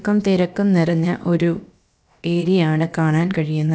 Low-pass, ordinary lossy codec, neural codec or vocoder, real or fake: none; none; codec, 16 kHz, about 1 kbps, DyCAST, with the encoder's durations; fake